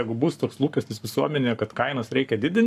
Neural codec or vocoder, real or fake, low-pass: codec, 44.1 kHz, 7.8 kbps, Pupu-Codec; fake; 14.4 kHz